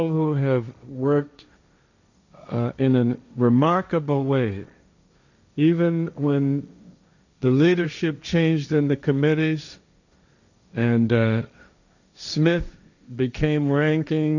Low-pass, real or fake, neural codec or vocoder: 7.2 kHz; fake; codec, 16 kHz, 1.1 kbps, Voila-Tokenizer